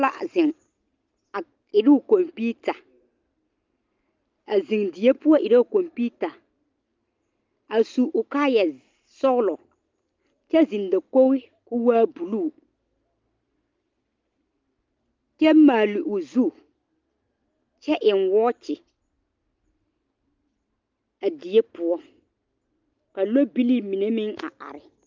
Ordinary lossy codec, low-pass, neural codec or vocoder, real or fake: Opus, 32 kbps; 7.2 kHz; none; real